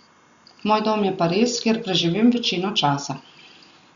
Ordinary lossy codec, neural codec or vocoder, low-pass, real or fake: Opus, 64 kbps; none; 7.2 kHz; real